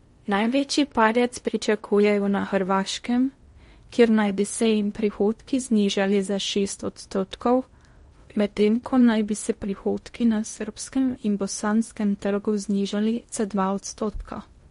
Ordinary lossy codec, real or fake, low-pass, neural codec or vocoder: MP3, 48 kbps; fake; 10.8 kHz; codec, 16 kHz in and 24 kHz out, 0.6 kbps, FocalCodec, streaming, 4096 codes